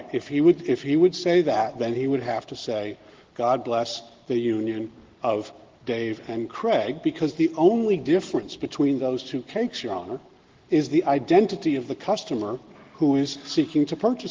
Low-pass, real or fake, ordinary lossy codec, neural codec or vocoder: 7.2 kHz; real; Opus, 16 kbps; none